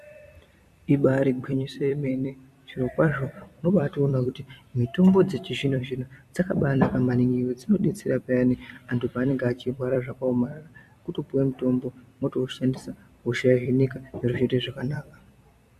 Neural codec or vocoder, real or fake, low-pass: vocoder, 44.1 kHz, 128 mel bands every 256 samples, BigVGAN v2; fake; 14.4 kHz